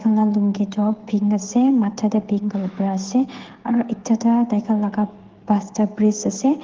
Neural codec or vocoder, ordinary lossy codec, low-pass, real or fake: codec, 16 kHz, 16 kbps, FreqCodec, smaller model; Opus, 16 kbps; 7.2 kHz; fake